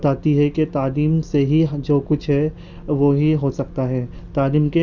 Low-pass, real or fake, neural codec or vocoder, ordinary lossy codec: 7.2 kHz; real; none; Opus, 64 kbps